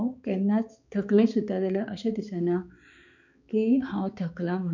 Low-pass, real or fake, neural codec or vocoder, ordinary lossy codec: 7.2 kHz; fake; codec, 16 kHz, 4 kbps, X-Codec, HuBERT features, trained on balanced general audio; none